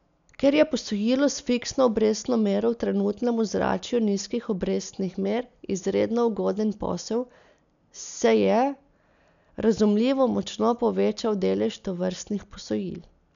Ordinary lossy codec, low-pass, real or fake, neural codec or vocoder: none; 7.2 kHz; real; none